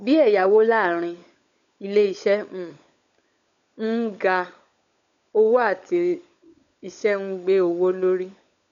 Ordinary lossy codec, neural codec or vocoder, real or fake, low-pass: none; codec, 16 kHz, 16 kbps, FunCodec, trained on LibriTTS, 50 frames a second; fake; 7.2 kHz